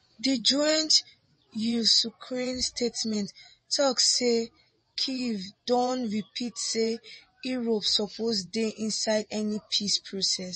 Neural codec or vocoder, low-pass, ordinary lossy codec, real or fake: vocoder, 24 kHz, 100 mel bands, Vocos; 10.8 kHz; MP3, 32 kbps; fake